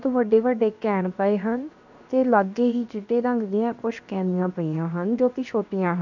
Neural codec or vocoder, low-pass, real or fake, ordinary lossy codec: codec, 16 kHz, 0.7 kbps, FocalCodec; 7.2 kHz; fake; none